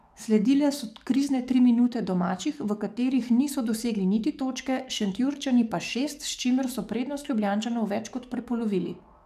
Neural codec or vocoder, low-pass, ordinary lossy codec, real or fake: codec, 44.1 kHz, 7.8 kbps, DAC; 14.4 kHz; none; fake